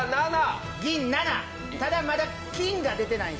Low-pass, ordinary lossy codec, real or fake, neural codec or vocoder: none; none; real; none